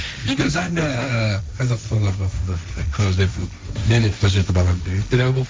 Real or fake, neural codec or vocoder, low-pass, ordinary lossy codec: fake; codec, 16 kHz, 1.1 kbps, Voila-Tokenizer; none; none